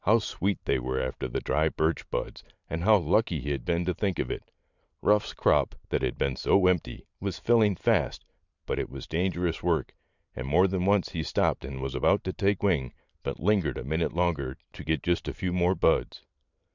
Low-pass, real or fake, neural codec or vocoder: 7.2 kHz; fake; vocoder, 44.1 kHz, 128 mel bands every 512 samples, BigVGAN v2